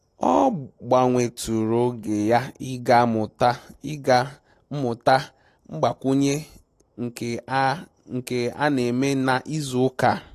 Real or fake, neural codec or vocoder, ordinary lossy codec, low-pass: real; none; AAC, 48 kbps; 14.4 kHz